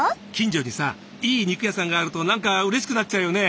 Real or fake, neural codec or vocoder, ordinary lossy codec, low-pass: real; none; none; none